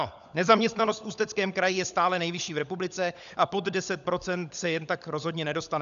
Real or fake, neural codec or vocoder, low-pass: fake; codec, 16 kHz, 16 kbps, FunCodec, trained on LibriTTS, 50 frames a second; 7.2 kHz